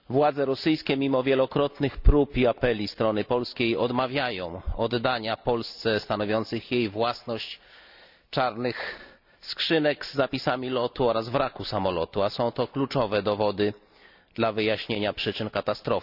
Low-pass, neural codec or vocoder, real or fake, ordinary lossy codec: 5.4 kHz; none; real; none